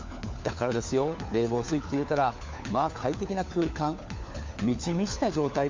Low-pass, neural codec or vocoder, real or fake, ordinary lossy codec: 7.2 kHz; codec, 16 kHz, 4 kbps, FunCodec, trained on LibriTTS, 50 frames a second; fake; MP3, 64 kbps